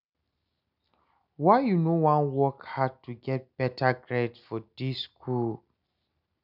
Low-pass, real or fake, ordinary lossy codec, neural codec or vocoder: 5.4 kHz; real; none; none